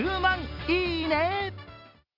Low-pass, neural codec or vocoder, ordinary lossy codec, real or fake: 5.4 kHz; none; none; real